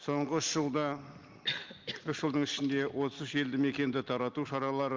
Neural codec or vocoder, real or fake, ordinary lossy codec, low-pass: none; real; Opus, 32 kbps; 7.2 kHz